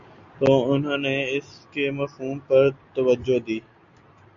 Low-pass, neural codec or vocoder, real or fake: 7.2 kHz; none; real